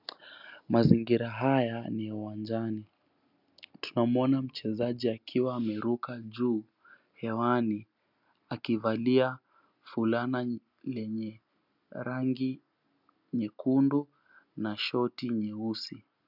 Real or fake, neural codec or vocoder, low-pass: real; none; 5.4 kHz